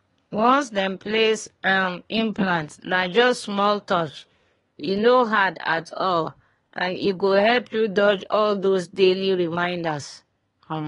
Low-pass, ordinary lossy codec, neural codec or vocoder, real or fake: 10.8 kHz; AAC, 32 kbps; codec, 24 kHz, 1 kbps, SNAC; fake